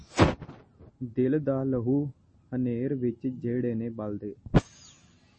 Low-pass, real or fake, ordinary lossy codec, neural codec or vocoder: 9.9 kHz; real; MP3, 32 kbps; none